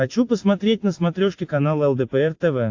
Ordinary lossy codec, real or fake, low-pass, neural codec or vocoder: AAC, 48 kbps; real; 7.2 kHz; none